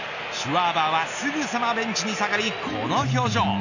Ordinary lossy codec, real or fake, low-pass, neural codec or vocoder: none; real; 7.2 kHz; none